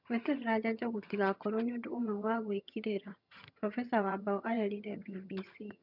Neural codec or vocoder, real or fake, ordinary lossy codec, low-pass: vocoder, 22.05 kHz, 80 mel bands, HiFi-GAN; fake; none; 5.4 kHz